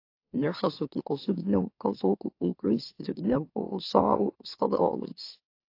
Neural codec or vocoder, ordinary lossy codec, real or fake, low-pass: autoencoder, 44.1 kHz, a latent of 192 numbers a frame, MeloTTS; AAC, 32 kbps; fake; 5.4 kHz